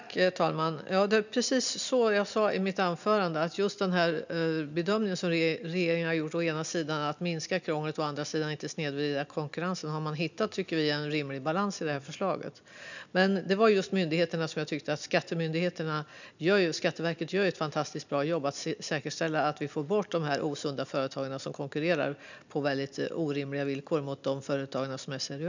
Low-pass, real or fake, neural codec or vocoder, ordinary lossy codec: 7.2 kHz; real; none; none